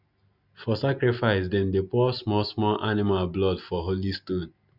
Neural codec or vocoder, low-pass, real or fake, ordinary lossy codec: none; 5.4 kHz; real; none